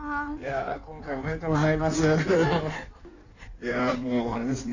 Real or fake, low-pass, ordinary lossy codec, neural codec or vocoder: fake; 7.2 kHz; none; codec, 16 kHz in and 24 kHz out, 1.1 kbps, FireRedTTS-2 codec